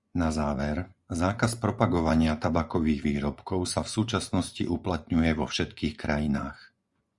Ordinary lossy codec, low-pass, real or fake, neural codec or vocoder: Opus, 64 kbps; 10.8 kHz; fake; vocoder, 24 kHz, 100 mel bands, Vocos